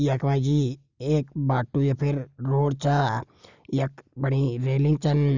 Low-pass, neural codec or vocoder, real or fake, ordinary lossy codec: 7.2 kHz; none; real; Opus, 64 kbps